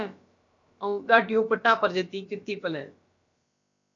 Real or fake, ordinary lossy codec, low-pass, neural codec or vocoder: fake; AAC, 48 kbps; 7.2 kHz; codec, 16 kHz, about 1 kbps, DyCAST, with the encoder's durations